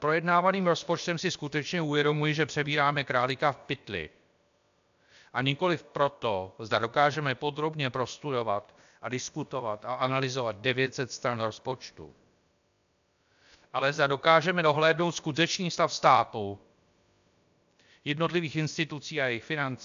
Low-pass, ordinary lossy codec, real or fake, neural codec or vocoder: 7.2 kHz; AAC, 96 kbps; fake; codec, 16 kHz, about 1 kbps, DyCAST, with the encoder's durations